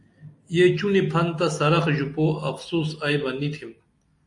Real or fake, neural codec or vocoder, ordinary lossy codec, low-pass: real; none; AAC, 64 kbps; 10.8 kHz